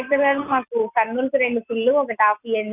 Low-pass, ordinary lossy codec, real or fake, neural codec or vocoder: 3.6 kHz; none; real; none